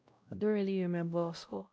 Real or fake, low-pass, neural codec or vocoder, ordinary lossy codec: fake; none; codec, 16 kHz, 0.5 kbps, X-Codec, WavLM features, trained on Multilingual LibriSpeech; none